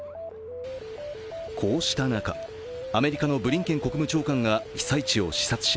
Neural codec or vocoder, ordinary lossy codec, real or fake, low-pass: none; none; real; none